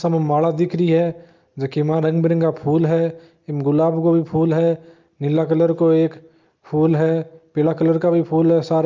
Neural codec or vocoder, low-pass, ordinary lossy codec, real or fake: none; 7.2 kHz; Opus, 24 kbps; real